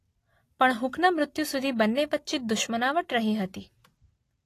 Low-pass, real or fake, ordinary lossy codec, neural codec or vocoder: 14.4 kHz; real; AAC, 48 kbps; none